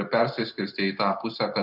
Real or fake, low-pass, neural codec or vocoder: real; 5.4 kHz; none